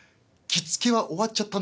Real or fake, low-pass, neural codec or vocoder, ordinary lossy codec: real; none; none; none